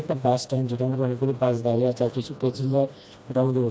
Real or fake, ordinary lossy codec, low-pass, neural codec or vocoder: fake; none; none; codec, 16 kHz, 1 kbps, FreqCodec, smaller model